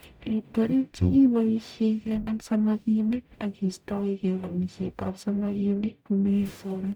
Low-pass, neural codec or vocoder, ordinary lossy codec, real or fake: none; codec, 44.1 kHz, 0.9 kbps, DAC; none; fake